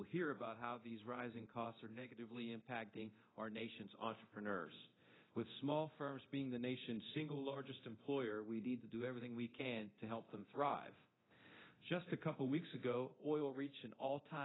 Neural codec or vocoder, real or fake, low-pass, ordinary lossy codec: codec, 24 kHz, 0.9 kbps, DualCodec; fake; 7.2 kHz; AAC, 16 kbps